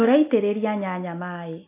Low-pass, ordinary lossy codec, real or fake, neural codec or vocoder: 3.6 kHz; AAC, 24 kbps; real; none